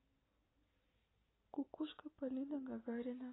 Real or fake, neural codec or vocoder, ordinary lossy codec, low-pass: real; none; AAC, 16 kbps; 7.2 kHz